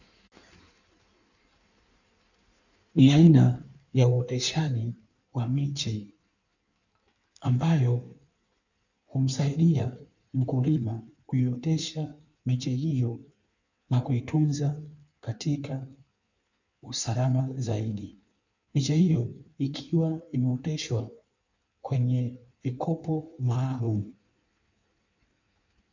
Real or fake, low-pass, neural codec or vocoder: fake; 7.2 kHz; codec, 16 kHz in and 24 kHz out, 1.1 kbps, FireRedTTS-2 codec